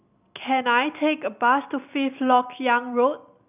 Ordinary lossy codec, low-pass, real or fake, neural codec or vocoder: none; 3.6 kHz; real; none